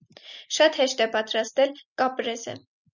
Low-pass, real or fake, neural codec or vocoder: 7.2 kHz; real; none